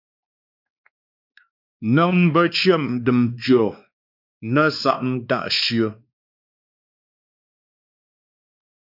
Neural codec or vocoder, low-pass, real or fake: codec, 16 kHz, 2 kbps, X-Codec, WavLM features, trained on Multilingual LibriSpeech; 5.4 kHz; fake